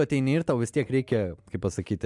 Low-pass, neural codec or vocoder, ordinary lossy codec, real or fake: 10.8 kHz; none; MP3, 96 kbps; real